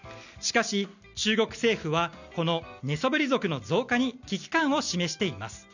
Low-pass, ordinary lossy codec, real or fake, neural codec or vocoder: 7.2 kHz; none; real; none